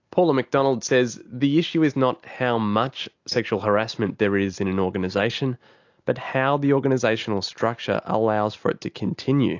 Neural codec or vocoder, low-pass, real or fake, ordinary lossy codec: none; 7.2 kHz; real; AAC, 48 kbps